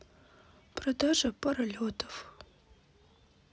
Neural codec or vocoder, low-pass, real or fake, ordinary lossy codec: none; none; real; none